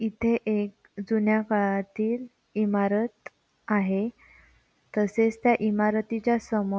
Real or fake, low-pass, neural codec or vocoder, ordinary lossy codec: real; none; none; none